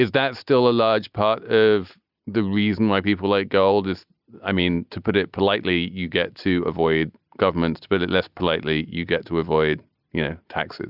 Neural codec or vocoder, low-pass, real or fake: none; 5.4 kHz; real